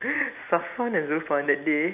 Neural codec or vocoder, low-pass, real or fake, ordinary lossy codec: none; 3.6 kHz; real; AAC, 32 kbps